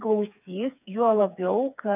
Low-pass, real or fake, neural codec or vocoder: 3.6 kHz; fake; codec, 16 kHz, 4 kbps, FreqCodec, smaller model